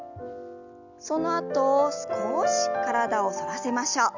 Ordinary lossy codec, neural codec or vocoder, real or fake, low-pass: none; none; real; 7.2 kHz